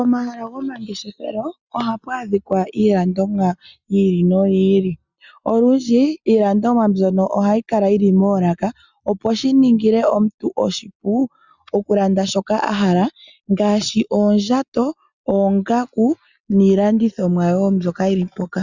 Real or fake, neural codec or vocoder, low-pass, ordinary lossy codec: real; none; 7.2 kHz; Opus, 64 kbps